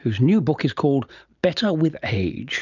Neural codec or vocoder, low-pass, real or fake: none; 7.2 kHz; real